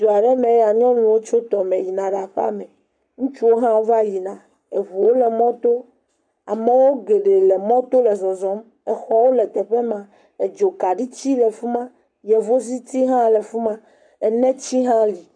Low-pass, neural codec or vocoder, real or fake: 9.9 kHz; codec, 44.1 kHz, 7.8 kbps, Pupu-Codec; fake